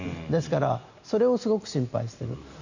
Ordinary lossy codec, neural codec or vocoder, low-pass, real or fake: none; none; 7.2 kHz; real